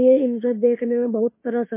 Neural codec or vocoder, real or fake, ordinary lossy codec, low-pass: autoencoder, 48 kHz, 32 numbers a frame, DAC-VAE, trained on Japanese speech; fake; MP3, 24 kbps; 3.6 kHz